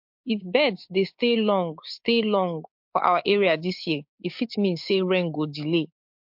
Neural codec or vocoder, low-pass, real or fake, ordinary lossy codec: codec, 16 kHz, 6 kbps, DAC; 5.4 kHz; fake; MP3, 48 kbps